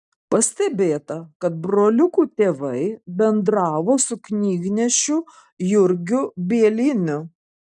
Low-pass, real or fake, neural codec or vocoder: 10.8 kHz; real; none